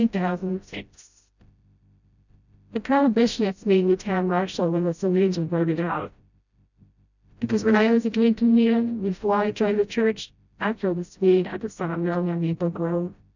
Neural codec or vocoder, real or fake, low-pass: codec, 16 kHz, 0.5 kbps, FreqCodec, smaller model; fake; 7.2 kHz